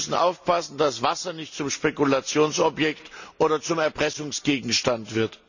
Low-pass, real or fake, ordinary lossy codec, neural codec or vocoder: 7.2 kHz; real; none; none